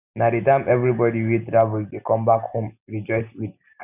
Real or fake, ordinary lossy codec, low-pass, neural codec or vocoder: real; none; 3.6 kHz; none